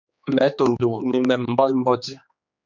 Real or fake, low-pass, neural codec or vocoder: fake; 7.2 kHz; codec, 16 kHz, 2 kbps, X-Codec, HuBERT features, trained on general audio